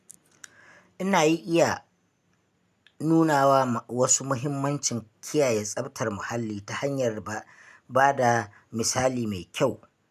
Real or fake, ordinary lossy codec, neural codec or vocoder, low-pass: real; none; none; 14.4 kHz